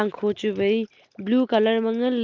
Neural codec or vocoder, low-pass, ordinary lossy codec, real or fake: none; 7.2 kHz; Opus, 32 kbps; real